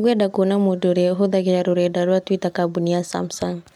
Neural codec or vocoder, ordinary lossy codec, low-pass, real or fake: none; MP3, 96 kbps; 19.8 kHz; real